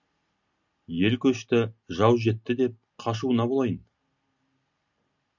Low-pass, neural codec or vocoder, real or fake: 7.2 kHz; none; real